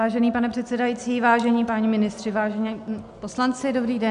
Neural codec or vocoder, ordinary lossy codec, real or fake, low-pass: none; MP3, 96 kbps; real; 10.8 kHz